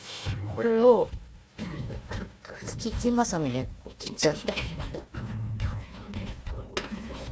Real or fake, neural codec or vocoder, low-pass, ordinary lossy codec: fake; codec, 16 kHz, 1 kbps, FunCodec, trained on Chinese and English, 50 frames a second; none; none